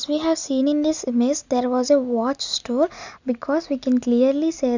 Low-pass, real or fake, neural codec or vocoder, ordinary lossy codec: 7.2 kHz; real; none; none